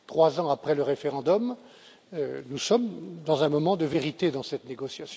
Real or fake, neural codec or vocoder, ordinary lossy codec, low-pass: real; none; none; none